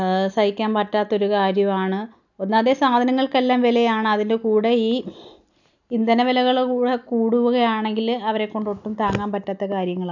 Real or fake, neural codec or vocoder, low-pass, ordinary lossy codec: real; none; 7.2 kHz; none